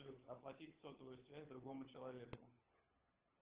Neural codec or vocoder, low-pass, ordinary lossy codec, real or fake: codec, 16 kHz, 16 kbps, FunCodec, trained on LibriTTS, 50 frames a second; 3.6 kHz; Opus, 16 kbps; fake